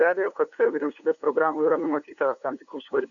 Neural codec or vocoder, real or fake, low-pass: codec, 16 kHz, 2 kbps, FunCodec, trained on LibriTTS, 25 frames a second; fake; 7.2 kHz